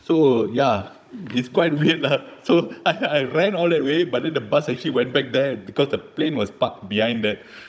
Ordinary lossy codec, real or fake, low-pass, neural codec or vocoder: none; fake; none; codec, 16 kHz, 16 kbps, FunCodec, trained on Chinese and English, 50 frames a second